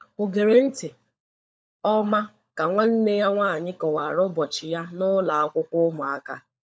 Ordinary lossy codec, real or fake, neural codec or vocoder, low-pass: none; fake; codec, 16 kHz, 16 kbps, FunCodec, trained on LibriTTS, 50 frames a second; none